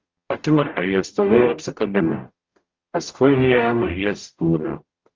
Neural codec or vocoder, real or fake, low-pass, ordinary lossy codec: codec, 44.1 kHz, 0.9 kbps, DAC; fake; 7.2 kHz; Opus, 32 kbps